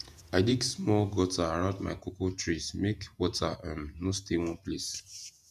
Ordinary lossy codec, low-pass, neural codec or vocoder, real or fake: none; 14.4 kHz; vocoder, 48 kHz, 128 mel bands, Vocos; fake